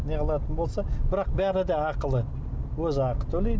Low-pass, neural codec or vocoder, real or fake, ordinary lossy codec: none; none; real; none